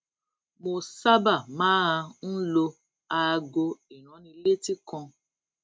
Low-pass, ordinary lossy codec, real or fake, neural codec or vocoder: none; none; real; none